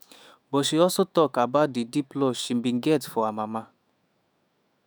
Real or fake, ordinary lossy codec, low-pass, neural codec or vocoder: fake; none; none; autoencoder, 48 kHz, 128 numbers a frame, DAC-VAE, trained on Japanese speech